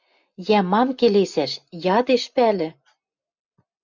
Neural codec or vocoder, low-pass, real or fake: none; 7.2 kHz; real